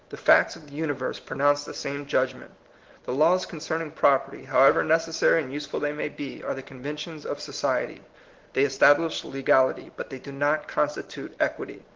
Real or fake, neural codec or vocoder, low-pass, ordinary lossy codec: real; none; 7.2 kHz; Opus, 16 kbps